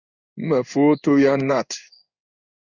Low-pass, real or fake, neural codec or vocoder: 7.2 kHz; fake; codec, 16 kHz in and 24 kHz out, 1 kbps, XY-Tokenizer